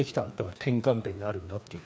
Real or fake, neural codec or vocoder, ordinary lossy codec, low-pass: fake; codec, 16 kHz, 2 kbps, FreqCodec, larger model; none; none